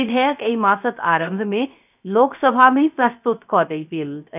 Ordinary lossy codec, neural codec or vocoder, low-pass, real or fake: none; codec, 16 kHz, 0.3 kbps, FocalCodec; 3.6 kHz; fake